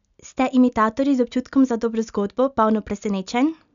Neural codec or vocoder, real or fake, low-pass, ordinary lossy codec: none; real; 7.2 kHz; none